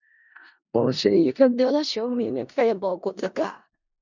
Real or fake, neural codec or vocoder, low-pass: fake; codec, 16 kHz in and 24 kHz out, 0.4 kbps, LongCat-Audio-Codec, four codebook decoder; 7.2 kHz